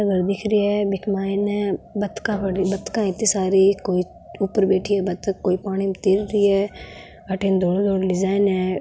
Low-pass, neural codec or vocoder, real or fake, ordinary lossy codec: none; none; real; none